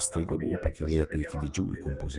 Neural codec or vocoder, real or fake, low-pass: codec, 32 kHz, 1.9 kbps, SNAC; fake; 10.8 kHz